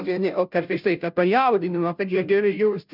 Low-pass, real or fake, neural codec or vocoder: 5.4 kHz; fake; codec, 16 kHz, 0.5 kbps, FunCodec, trained on Chinese and English, 25 frames a second